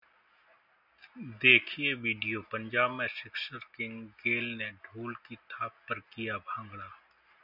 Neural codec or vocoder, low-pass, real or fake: none; 5.4 kHz; real